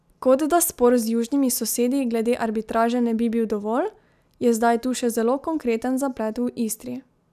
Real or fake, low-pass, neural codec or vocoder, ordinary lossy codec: real; 14.4 kHz; none; none